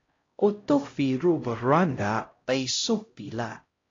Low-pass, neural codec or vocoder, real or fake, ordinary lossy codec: 7.2 kHz; codec, 16 kHz, 0.5 kbps, X-Codec, HuBERT features, trained on LibriSpeech; fake; AAC, 32 kbps